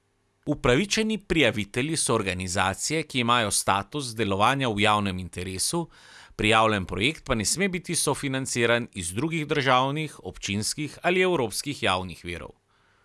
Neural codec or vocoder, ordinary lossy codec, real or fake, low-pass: none; none; real; none